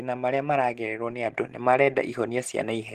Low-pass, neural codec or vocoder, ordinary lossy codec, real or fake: 14.4 kHz; none; Opus, 16 kbps; real